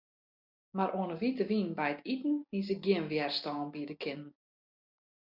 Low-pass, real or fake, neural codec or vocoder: 5.4 kHz; real; none